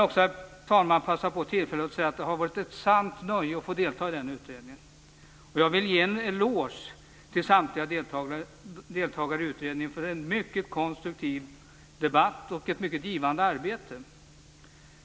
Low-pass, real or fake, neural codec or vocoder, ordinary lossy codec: none; real; none; none